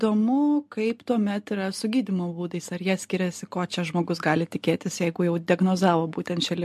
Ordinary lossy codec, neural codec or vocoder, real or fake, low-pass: MP3, 64 kbps; none; real; 14.4 kHz